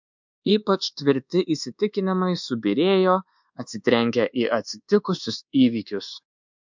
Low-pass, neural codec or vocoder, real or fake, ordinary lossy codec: 7.2 kHz; codec, 24 kHz, 1.2 kbps, DualCodec; fake; MP3, 64 kbps